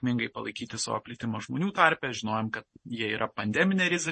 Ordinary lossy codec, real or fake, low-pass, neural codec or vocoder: MP3, 32 kbps; real; 10.8 kHz; none